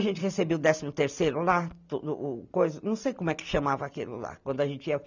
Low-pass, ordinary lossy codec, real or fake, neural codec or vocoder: 7.2 kHz; none; real; none